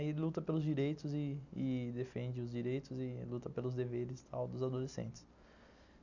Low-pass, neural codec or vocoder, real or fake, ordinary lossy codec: 7.2 kHz; none; real; none